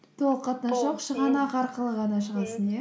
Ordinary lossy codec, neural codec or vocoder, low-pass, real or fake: none; none; none; real